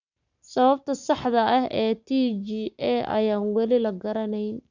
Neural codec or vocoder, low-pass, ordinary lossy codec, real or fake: codec, 44.1 kHz, 7.8 kbps, Pupu-Codec; 7.2 kHz; none; fake